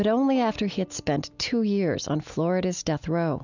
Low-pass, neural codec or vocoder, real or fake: 7.2 kHz; none; real